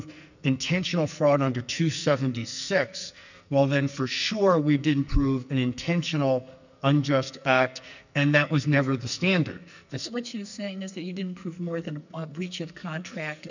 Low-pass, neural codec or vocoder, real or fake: 7.2 kHz; codec, 44.1 kHz, 2.6 kbps, SNAC; fake